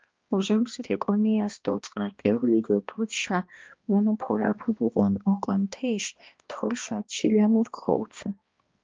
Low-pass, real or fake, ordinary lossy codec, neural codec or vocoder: 7.2 kHz; fake; Opus, 24 kbps; codec, 16 kHz, 1 kbps, X-Codec, HuBERT features, trained on balanced general audio